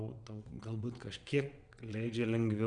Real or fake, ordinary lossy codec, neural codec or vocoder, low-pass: fake; Opus, 64 kbps; vocoder, 22.05 kHz, 80 mel bands, WaveNeXt; 9.9 kHz